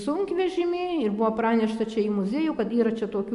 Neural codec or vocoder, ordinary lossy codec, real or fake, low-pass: none; AAC, 96 kbps; real; 10.8 kHz